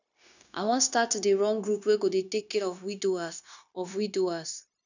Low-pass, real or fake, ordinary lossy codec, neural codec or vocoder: 7.2 kHz; fake; none; codec, 16 kHz, 0.9 kbps, LongCat-Audio-Codec